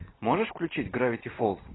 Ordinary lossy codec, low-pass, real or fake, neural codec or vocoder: AAC, 16 kbps; 7.2 kHz; real; none